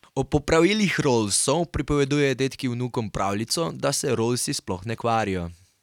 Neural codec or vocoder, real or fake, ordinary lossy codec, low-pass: none; real; none; 19.8 kHz